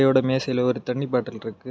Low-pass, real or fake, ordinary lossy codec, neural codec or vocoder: none; real; none; none